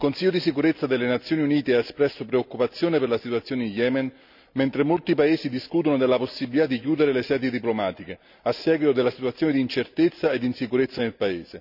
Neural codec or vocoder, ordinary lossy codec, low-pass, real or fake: none; none; 5.4 kHz; real